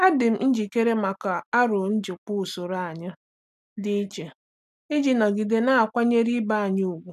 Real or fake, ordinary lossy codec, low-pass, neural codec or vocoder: real; none; 14.4 kHz; none